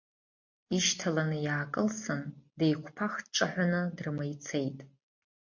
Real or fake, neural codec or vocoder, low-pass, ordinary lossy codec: real; none; 7.2 kHz; MP3, 48 kbps